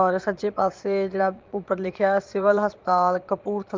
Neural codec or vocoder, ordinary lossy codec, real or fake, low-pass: vocoder, 22.05 kHz, 80 mel bands, Vocos; Opus, 24 kbps; fake; 7.2 kHz